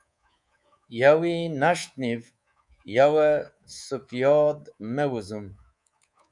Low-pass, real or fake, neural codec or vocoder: 10.8 kHz; fake; codec, 24 kHz, 3.1 kbps, DualCodec